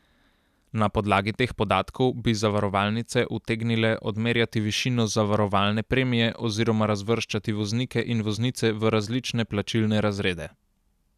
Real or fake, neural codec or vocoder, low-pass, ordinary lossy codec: real; none; 14.4 kHz; none